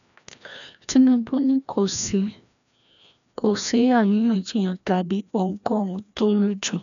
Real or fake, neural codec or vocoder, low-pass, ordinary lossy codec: fake; codec, 16 kHz, 1 kbps, FreqCodec, larger model; 7.2 kHz; MP3, 96 kbps